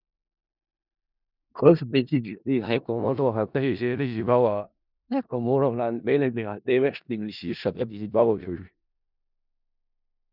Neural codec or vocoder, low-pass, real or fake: codec, 16 kHz in and 24 kHz out, 0.4 kbps, LongCat-Audio-Codec, four codebook decoder; 5.4 kHz; fake